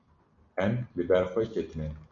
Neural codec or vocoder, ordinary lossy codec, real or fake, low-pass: none; MP3, 64 kbps; real; 7.2 kHz